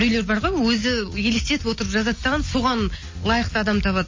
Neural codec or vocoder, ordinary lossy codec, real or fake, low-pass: none; MP3, 32 kbps; real; 7.2 kHz